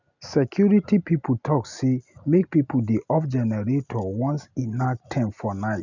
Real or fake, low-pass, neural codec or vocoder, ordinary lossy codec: real; 7.2 kHz; none; none